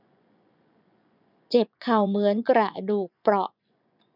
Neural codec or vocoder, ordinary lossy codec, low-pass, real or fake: vocoder, 24 kHz, 100 mel bands, Vocos; none; 5.4 kHz; fake